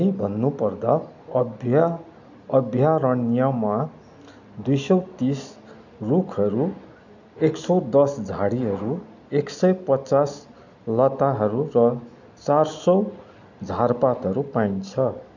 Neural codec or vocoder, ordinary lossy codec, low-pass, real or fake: none; none; 7.2 kHz; real